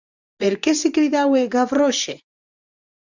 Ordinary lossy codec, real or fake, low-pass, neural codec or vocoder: Opus, 64 kbps; fake; 7.2 kHz; vocoder, 24 kHz, 100 mel bands, Vocos